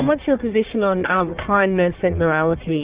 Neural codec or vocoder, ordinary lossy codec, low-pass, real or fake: codec, 44.1 kHz, 1.7 kbps, Pupu-Codec; Opus, 64 kbps; 3.6 kHz; fake